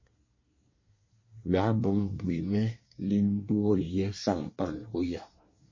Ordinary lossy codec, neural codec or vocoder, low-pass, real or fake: MP3, 32 kbps; codec, 24 kHz, 1 kbps, SNAC; 7.2 kHz; fake